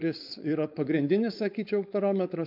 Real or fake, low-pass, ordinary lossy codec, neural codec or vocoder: fake; 5.4 kHz; MP3, 48 kbps; codec, 16 kHz, 4.8 kbps, FACodec